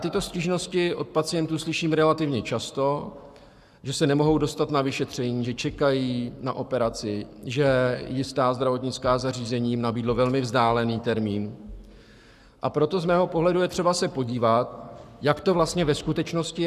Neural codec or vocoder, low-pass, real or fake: codec, 44.1 kHz, 7.8 kbps, Pupu-Codec; 14.4 kHz; fake